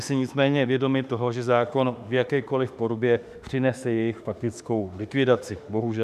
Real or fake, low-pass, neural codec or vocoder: fake; 14.4 kHz; autoencoder, 48 kHz, 32 numbers a frame, DAC-VAE, trained on Japanese speech